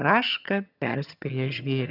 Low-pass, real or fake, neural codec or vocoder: 5.4 kHz; fake; vocoder, 22.05 kHz, 80 mel bands, HiFi-GAN